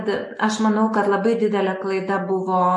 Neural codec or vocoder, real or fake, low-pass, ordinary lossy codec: none; real; 10.8 kHz; MP3, 48 kbps